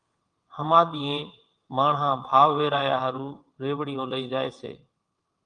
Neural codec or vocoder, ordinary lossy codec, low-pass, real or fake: vocoder, 22.05 kHz, 80 mel bands, WaveNeXt; Opus, 32 kbps; 9.9 kHz; fake